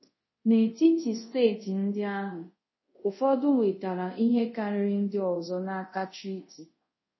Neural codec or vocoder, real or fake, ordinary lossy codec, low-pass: codec, 24 kHz, 0.5 kbps, DualCodec; fake; MP3, 24 kbps; 7.2 kHz